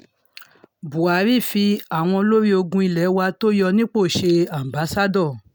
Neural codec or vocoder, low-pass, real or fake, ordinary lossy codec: none; none; real; none